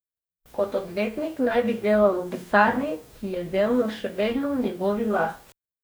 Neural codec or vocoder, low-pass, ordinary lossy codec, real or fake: codec, 44.1 kHz, 2.6 kbps, DAC; none; none; fake